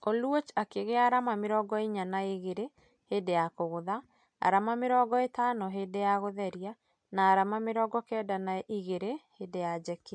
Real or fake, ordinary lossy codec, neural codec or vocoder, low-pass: real; MP3, 64 kbps; none; 9.9 kHz